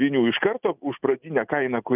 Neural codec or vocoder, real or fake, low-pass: none; real; 3.6 kHz